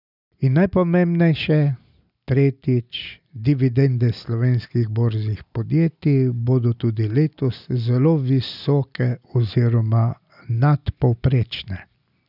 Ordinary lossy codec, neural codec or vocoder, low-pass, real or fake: none; none; 5.4 kHz; real